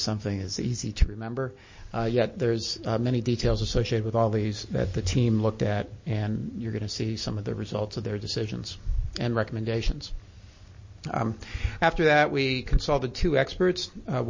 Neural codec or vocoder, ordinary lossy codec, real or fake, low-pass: none; MP3, 32 kbps; real; 7.2 kHz